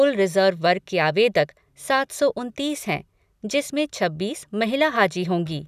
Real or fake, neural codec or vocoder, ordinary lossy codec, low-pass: real; none; none; 14.4 kHz